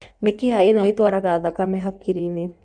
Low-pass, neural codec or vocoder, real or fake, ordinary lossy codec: 9.9 kHz; codec, 16 kHz in and 24 kHz out, 1.1 kbps, FireRedTTS-2 codec; fake; none